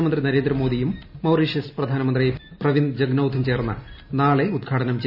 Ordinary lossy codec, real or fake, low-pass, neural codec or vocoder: none; real; 5.4 kHz; none